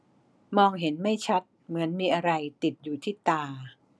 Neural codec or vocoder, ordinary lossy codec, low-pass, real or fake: none; none; none; real